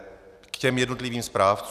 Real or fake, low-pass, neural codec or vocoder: real; 14.4 kHz; none